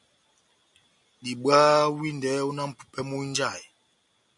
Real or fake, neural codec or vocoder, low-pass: real; none; 10.8 kHz